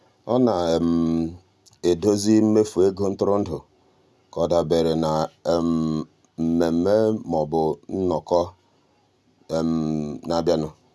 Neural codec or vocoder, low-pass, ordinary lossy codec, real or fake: none; none; none; real